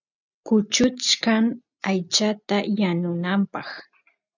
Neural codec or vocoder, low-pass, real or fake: none; 7.2 kHz; real